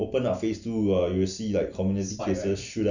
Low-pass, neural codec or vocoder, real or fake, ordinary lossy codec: 7.2 kHz; none; real; none